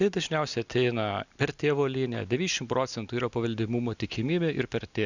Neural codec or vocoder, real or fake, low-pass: none; real; 7.2 kHz